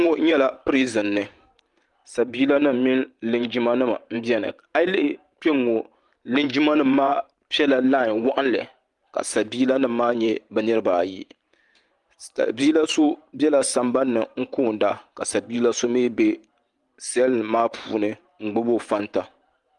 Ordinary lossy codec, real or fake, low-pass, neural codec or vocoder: Opus, 32 kbps; fake; 10.8 kHz; vocoder, 44.1 kHz, 128 mel bands every 512 samples, BigVGAN v2